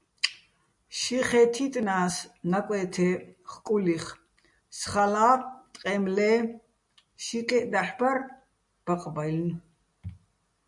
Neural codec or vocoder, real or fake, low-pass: none; real; 10.8 kHz